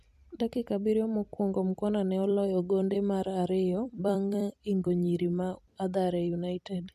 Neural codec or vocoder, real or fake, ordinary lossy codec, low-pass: vocoder, 44.1 kHz, 128 mel bands every 256 samples, BigVGAN v2; fake; MP3, 96 kbps; 14.4 kHz